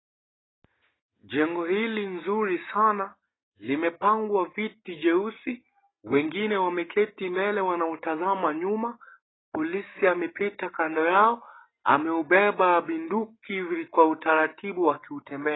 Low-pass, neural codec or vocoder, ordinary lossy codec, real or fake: 7.2 kHz; none; AAC, 16 kbps; real